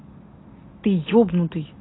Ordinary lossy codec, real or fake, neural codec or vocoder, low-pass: AAC, 16 kbps; real; none; 7.2 kHz